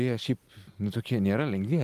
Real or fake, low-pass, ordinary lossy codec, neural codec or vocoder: fake; 14.4 kHz; Opus, 32 kbps; vocoder, 44.1 kHz, 128 mel bands every 256 samples, BigVGAN v2